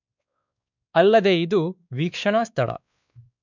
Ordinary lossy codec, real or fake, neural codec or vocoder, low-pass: none; fake; codec, 16 kHz, 2 kbps, X-Codec, WavLM features, trained on Multilingual LibriSpeech; 7.2 kHz